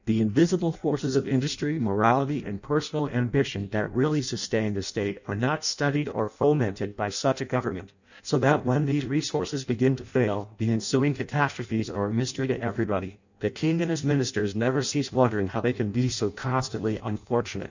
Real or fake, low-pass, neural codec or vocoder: fake; 7.2 kHz; codec, 16 kHz in and 24 kHz out, 0.6 kbps, FireRedTTS-2 codec